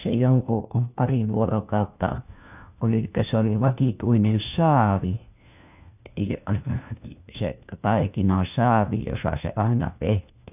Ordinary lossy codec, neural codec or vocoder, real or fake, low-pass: none; codec, 16 kHz, 1 kbps, FunCodec, trained on LibriTTS, 50 frames a second; fake; 3.6 kHz